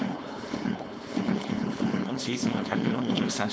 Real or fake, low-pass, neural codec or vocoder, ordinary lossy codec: fake; none; codec, 16 kHz, 4.8 kbps, FACodec; none